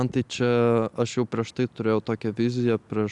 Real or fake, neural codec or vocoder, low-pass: real; none; 10.8 kHz